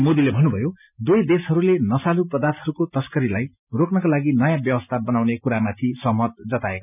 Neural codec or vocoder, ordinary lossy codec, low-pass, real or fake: none; none; 3.6 kHz; real